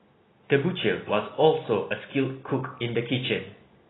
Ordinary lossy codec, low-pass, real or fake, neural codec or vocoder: AAC, 16 kbps; 7.2 kHz; real; none